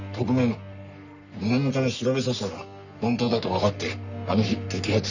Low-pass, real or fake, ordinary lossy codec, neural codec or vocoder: 7.2 kHz; fake; none; codec, 44.1 kHz, 3.4 kbps, Pupu-Codec